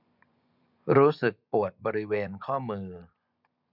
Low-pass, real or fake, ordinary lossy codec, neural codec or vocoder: 5.4 kHz; fake; none; vocoder, 24 kHz, 100 mel bands, Vocos